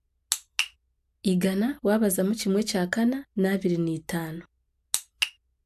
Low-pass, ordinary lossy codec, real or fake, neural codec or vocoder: 14.4 kHz; AAC, 96 kbps; real; none